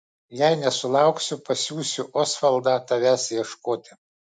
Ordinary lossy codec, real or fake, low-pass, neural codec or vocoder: MP3, 64 kbps; real; 10.8 kHz; none